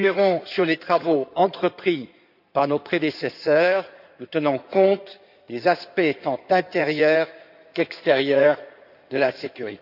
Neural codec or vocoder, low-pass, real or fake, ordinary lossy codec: codec, 16 kHz in and 24 kHz out, 2.2 kbps, FireRedTTS-2 codec; 5.4 kHz; fake; none